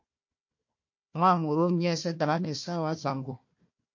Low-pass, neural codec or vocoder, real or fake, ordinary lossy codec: 7.2 kHz; codec, 16 kHz, 1 kbps, FunCodec, trained on Chinese and English, 50 frames a second; fake; MP3, 48 kbps